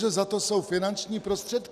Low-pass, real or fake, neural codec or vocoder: 14.4 kHz; real; none